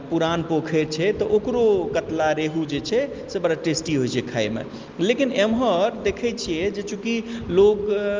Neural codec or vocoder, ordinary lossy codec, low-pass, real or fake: none; Opus, 32 kbps; 7.2 kHz; real